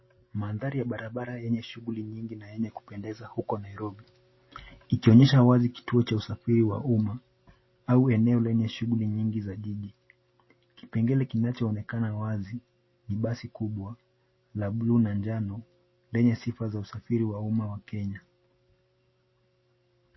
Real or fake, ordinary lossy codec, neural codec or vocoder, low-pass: real; MP3, 24 kbps; none; 7.2 kHz